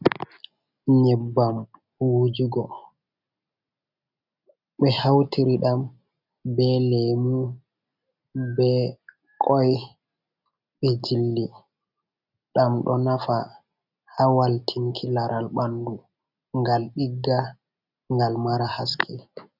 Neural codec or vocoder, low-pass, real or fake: none; 5.4 kHz; real